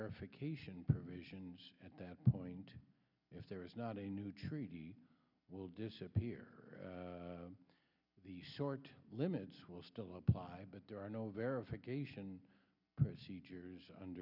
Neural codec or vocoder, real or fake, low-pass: none; real; 5.4 kHz